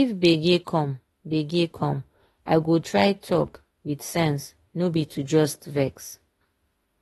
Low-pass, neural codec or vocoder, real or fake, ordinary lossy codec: 19.8 kHz; autoencoder, 48 kHz, 32 numbers a frame, DAC-VAE, trained on Japanese speech; fake; AAC, 32 kbps